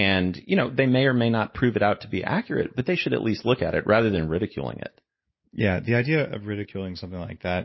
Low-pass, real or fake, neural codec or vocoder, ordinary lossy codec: 7.2 kHz; real; none; MP3, 24 kbps